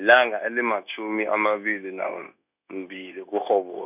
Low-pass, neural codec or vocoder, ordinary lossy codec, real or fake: 3.6 kHz; codec, 16 kHz, 0.9 kbps, LongCat-Audio-Codec; none; fake